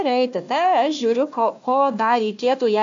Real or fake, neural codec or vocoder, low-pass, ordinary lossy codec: fake; codec, 16 kHz, 1 kbps, FunCodec, trained on Chinese and English, 50 frames a second; 7.2 kHz; AAC, 64 kbps